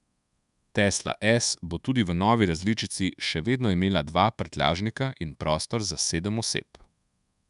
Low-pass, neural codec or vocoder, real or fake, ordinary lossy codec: 10.8 kHz; codec, 24 kHz, 1.2 kbps, DualCodec; fake; none